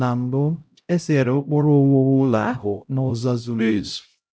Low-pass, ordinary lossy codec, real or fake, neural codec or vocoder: none; none; fake; codec, 16 kHz, 0.5 kbps, X-Codec, HuBERT features, trained on LibriSpeech